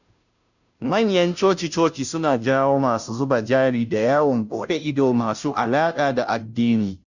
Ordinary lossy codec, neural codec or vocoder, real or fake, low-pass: none; codec, 16 kHz, 0.5 kbps, FunCodec, trained on Chinese and English, 25 frames a second; fake; 7.2 kHz